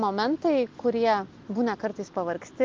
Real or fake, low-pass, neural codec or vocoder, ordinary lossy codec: real; 7.2 kHz; none; Opus, 24 kbps